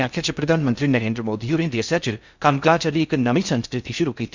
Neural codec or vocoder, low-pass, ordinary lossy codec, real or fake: codec, 16 kHz in and 24 kHz out, 0.6 kbps, FocalCodec, streaming, 2048 codes; 7.2 kHz; Opus, 64 kbps; fake